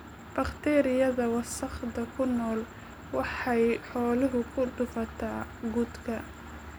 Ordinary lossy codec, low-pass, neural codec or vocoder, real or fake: none; none; none; real